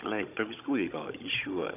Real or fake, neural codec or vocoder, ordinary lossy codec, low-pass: fake; codec, 16 kHz, 16 kbps, FunCodec, trained on Chinese and English, 50 frames a second; none; 3.6 kHz